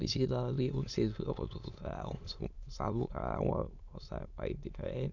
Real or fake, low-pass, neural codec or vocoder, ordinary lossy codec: fake; 7.2 kHz; autoencoder, 22.05 kHz, a latent of 192 numbers a frame, VITS, trained on many speakers; none